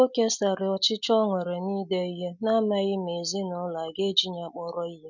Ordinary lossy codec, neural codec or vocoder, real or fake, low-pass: none; none; real; 7.2 kHz